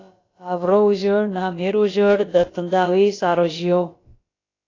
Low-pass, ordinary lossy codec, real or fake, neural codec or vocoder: 7.2 kHz; AAC, 32 kbps; fake; codec, 16 kHz, about 1 kbps, DyCAST, with the encoder's durations